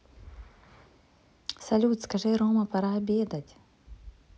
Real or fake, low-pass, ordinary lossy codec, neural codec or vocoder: real; none; none; none